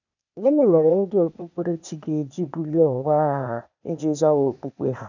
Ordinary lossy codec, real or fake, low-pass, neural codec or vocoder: none; fake; 7.2 kHz; codec, 16 kHz, 0.8 kbps, ZipCodec